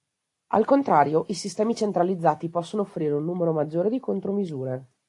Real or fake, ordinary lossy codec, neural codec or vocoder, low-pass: real; AAC, 48 kbps; none; 10.8 kHz